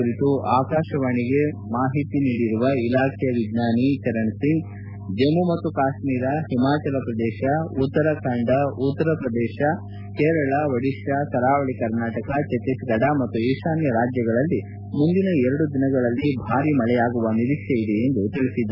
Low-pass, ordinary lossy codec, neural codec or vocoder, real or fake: 5.4 kHz; none; none; real